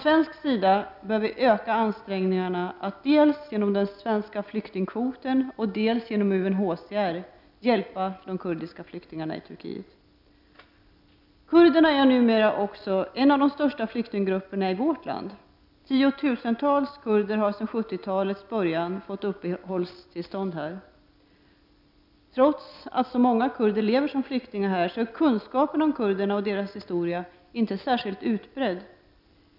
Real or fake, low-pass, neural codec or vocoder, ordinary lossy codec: real; 5.4 kHz; none; none